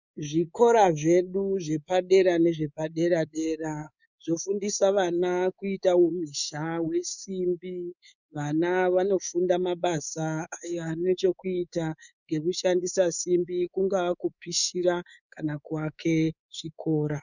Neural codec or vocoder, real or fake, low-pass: codec, 24 kHz, 3.1 kbps, DualCodec; fake; 7.2 kHz